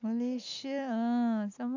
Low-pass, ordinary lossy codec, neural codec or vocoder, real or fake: 7.2 kHz; none; none; real